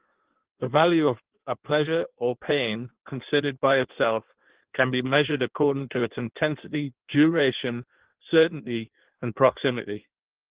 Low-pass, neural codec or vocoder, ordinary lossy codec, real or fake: 3.6 kHz; codec, 16 kHz in and 24 kHz out, 1.1 kbps, FireRedTTS-2 codec; Opus, 16 kbps; fake